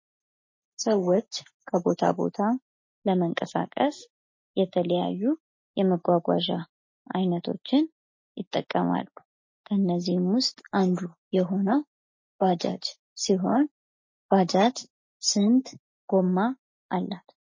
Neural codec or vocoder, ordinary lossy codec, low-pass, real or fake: none; MP3, 32 kbps; 7.2 kHz; real